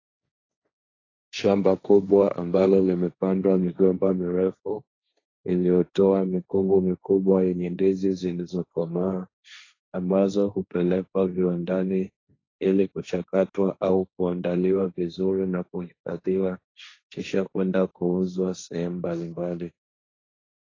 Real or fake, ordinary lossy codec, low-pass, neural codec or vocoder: fake; AAC, 32 kbps; 7.2 kHz; codec, 16 kHz, 1.1 kbps, Voila-Tokenizer